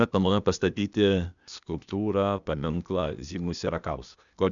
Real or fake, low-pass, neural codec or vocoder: fake; 7.2 kHz; codec, 16 kHz, 0.8 kbps, ZipCodec